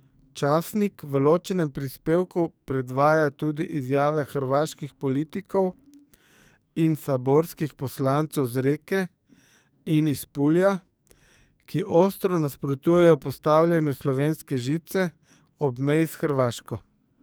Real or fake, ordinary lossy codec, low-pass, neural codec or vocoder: fake; none; none; codec, 44.1 kHz, 2.6 kbps, SNAC